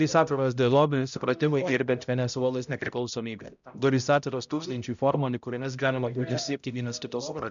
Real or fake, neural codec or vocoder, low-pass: fake; codec, 16 kHz, 0.5 kbps, X-Codec, HuBERT features, trained on balanced general audio; 7.2 kHz